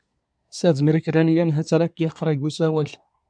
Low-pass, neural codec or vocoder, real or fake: 9.9 kHz; codec, 24 kHz, 1 kbps, SNAC; fake